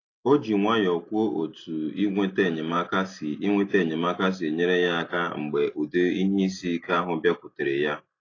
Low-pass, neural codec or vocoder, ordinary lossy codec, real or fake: 7.2 kHz; none; AAC, 32 kbps; real